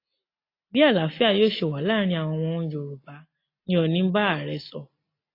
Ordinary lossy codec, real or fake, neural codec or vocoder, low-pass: AAC, 32 kbps; real; none; 5.4 kHz